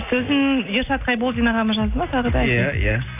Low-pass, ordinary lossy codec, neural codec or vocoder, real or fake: 3.6 kHz; AAC, 24 kbps; none; real